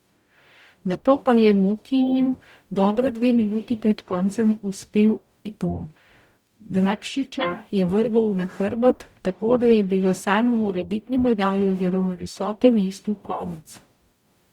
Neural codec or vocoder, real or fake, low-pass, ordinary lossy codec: codec, 44.1 kHz, 0.9 kbps, DAC; fake; 19.8 kHz; Opus, 64 kbps